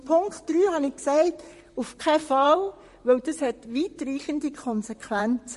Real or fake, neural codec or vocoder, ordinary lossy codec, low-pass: fake; vocoder, 44.1 kHz, 128 mel bands, Pupu-Vocoder; MP3, 48 kbps; 14.4 kHz